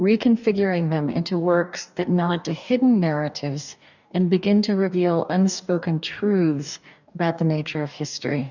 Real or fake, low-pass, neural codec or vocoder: fake; 7.2 kHz; codec, 44.1 kHz, 2.6 kbps, DAC